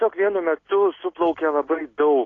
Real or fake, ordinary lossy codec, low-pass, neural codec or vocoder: real; AAC, 32 kbps; 7.2 kHz; none